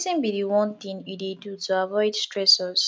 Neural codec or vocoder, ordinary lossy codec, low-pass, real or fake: none; none; none; real